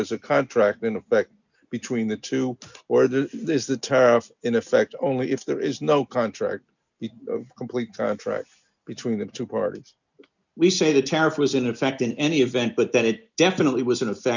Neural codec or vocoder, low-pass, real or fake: none; 7.2 kHz; real